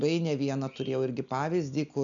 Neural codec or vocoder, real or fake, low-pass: none; real; 7.2 kHz